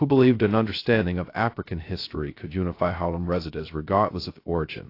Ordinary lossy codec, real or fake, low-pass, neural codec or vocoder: AAC, 32 kbps; fake; 5.4 kHz; codec, 16 kHz, 0.2 kbps, FocalCodec